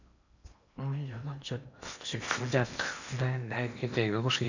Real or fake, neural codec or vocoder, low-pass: fake; codec, 16 kHz in and 24 kHz out, 0.8 kbps, FocalCodec, streaming, 65536 codes; 7.2 kHz